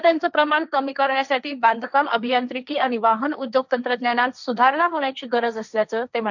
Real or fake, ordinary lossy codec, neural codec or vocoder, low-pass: fake; none; codec, 16 kHz, 1.1 kbps, Voila-Tokenizer; 7.2 kHz